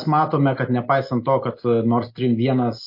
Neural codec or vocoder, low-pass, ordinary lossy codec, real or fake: none; 5.4 kHz; MP3, 32 kbps; real